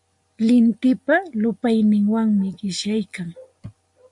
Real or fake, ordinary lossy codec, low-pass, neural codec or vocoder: real; MP3, 64 kbps; 10.8 kHz; none